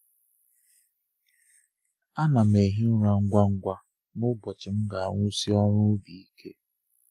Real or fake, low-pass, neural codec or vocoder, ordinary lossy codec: real; 14.4 kHz; none; Opus, 32 kbps